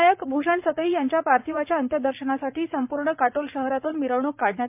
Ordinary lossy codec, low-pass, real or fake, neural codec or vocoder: MP3, 32 kbps; 3.6 kHz; fake; vocoder, 44.1 kHz, 80 mel bands, Vocos